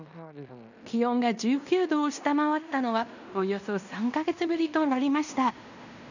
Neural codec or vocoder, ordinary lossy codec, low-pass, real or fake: codec, 16 kHz in and 24 kHz out, 0.9 kbps, LongCat-Audio-Codec, fine tuned four codebook decoder; none; 7.2 kHz; fake